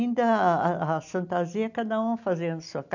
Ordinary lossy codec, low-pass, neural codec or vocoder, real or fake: none; 7.2 kHz; none; real